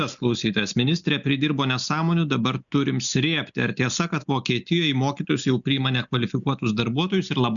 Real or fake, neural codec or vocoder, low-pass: real; none; 7.2 kHz